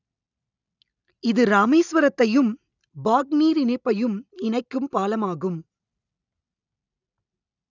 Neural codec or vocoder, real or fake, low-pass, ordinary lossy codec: none; real; 7.2 kHz; none